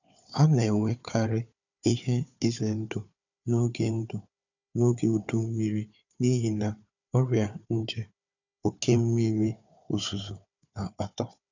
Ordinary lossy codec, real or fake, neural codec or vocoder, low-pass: none; fake; codec, 16 kHz, 4 kbps, FunCodec, trained on Chinese and English, 50 frames a second; 7.2 kHz